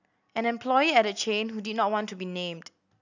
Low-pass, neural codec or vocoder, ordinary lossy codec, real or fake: 7.2 kHz; none; none; real